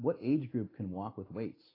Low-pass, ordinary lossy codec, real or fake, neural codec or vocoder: 5.4 kHz; AAC, 24 kbps; real; none